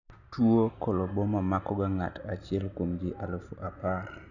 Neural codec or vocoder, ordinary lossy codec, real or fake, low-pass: none; none; real; 7.2 kHz